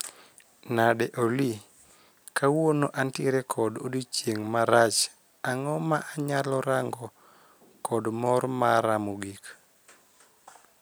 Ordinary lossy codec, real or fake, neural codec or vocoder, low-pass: none; real; none; none